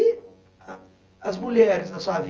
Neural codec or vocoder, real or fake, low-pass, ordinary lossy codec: vocoder, 24 kHz, 100 mel bands, Vocos; fake; 7.2 kHz; Opus, 24 kbps